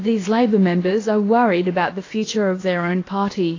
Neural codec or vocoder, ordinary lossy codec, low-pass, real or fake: codec, 16 kHz, about 1 kbps, DyCAST, with the encoder's durations; AAC, 32 kbps; 7.2 kHz; fake